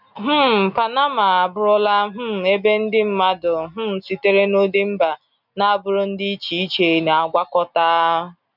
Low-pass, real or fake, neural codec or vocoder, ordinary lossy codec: 5.4 kHz; real; none; none